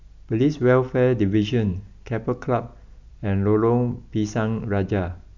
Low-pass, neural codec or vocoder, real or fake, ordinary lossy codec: 7.2 kHz; none; real; none